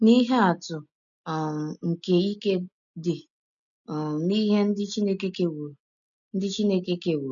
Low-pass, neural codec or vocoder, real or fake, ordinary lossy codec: 7.2 kHz; none; real; none